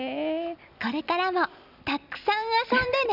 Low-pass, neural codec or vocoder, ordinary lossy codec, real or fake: 5.4 kHz; none; none; real